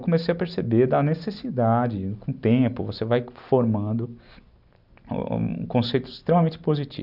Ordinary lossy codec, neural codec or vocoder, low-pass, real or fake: none; none; 5.4 kHz; real